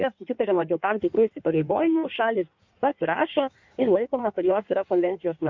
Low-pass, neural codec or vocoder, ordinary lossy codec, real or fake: 7.2 kHz; codec, 16 kHz in and 24 kHz out, 1.1 kbps, FireRedTTS-2 codec; MP3, 64 kbps; fake